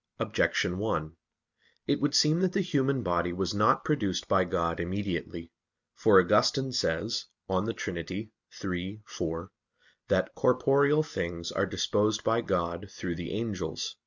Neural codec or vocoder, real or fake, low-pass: none; real; 7.2 kHz